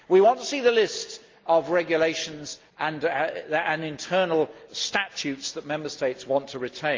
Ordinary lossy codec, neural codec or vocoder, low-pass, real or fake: Opus, 32 kbps; none; 7.2 kHz; real